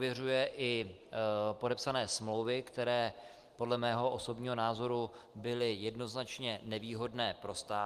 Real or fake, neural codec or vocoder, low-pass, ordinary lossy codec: real; none; 14.4 kHz; Opus, 24 kbps